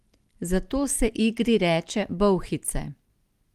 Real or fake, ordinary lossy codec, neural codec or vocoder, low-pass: real; Opus, 24 kbps; none; 14.4 kHz